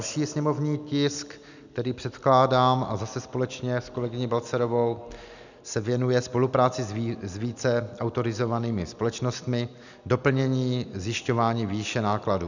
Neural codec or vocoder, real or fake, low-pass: none; real; 7.2 kHz